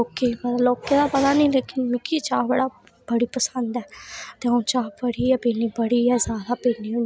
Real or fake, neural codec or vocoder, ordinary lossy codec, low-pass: real; none; none; none